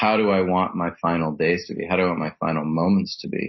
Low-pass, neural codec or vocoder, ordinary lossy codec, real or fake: 7.2 kHz; none; MP3, 24 kbps; real